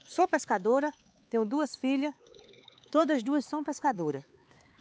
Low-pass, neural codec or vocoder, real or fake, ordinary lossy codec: none; codec, 16 kHz, 4 kbps, X-Codec, HuBERT features, trained on LibriSpeech; fake; none